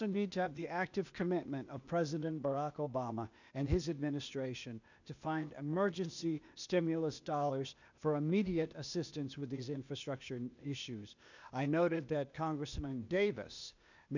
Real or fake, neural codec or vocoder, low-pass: fake; codec, 16 kHz, 0.8 kbps, ZipCodec; 7.2 kHz